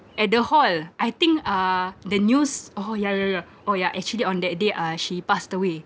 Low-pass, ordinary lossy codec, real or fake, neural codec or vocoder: none; none; real; none